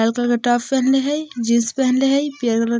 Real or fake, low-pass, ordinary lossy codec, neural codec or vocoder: real; none; none; none